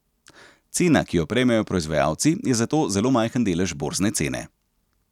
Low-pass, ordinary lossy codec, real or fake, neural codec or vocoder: 19.8 kHz; none; real; none